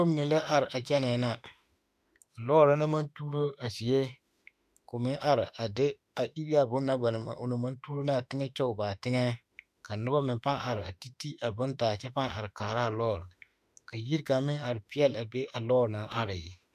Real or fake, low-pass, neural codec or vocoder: fake; 14.4 kHz; autoencoder, 48 kHz, 32 numbers a frame, DAC-VAE, trained on Japanese speech